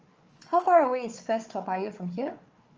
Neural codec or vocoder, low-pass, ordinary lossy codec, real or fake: codec, 16 kHz, 4 kbps, FunCodec, trained on Chinese and English, 50 frames a second; 7.2 kHz; Opus, 24 kbps; fake